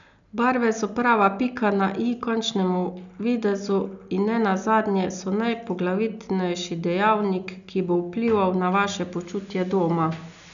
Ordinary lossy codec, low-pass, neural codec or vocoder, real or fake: none; 7.2 kHz; none; real